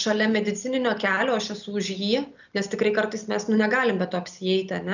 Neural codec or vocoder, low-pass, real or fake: none; 7.2 kHz; real